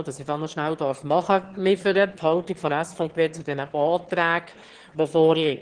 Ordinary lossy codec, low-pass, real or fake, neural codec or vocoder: Opus, 16 kbps; 9.9 kHz; fake; autoencoder, 22.05 kHz, a latent of 192 numbers a frame, VITS, trained on one speaker